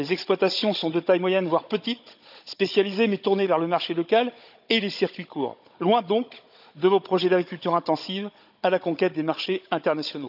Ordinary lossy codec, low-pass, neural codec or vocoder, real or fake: none; 5.4 kHz; codec, 16 kHz, 16 kbps, FunCodec, trained on Chinese and English, 50 frames a second; fake